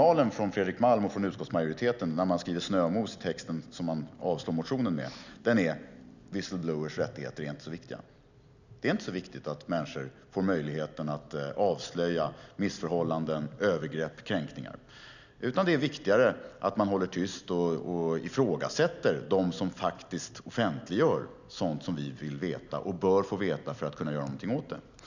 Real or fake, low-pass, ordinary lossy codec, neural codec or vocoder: real; 7.2 kHz; none; none